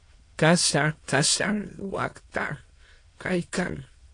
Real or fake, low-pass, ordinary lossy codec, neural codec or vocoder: fake; 9.9 kHz; AAC, 48 kbps; autoencoder, 22.05 kHz, a latent of 192 numbers a frame, VITS, trained on many speakers